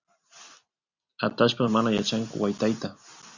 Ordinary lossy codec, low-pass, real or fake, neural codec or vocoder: Opus, 64 kbps; 7.2 kHz; real; none